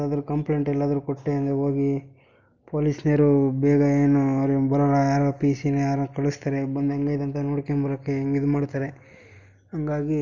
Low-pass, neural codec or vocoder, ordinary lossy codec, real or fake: 7.2 kHz; none; Opus, 32 kbps; real